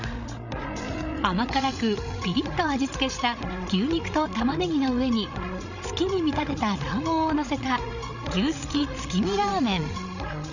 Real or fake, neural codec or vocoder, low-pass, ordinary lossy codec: fake; codec, 16 kHz, 16 kbps, FreqCodec, larger model; 7.2 kHz; none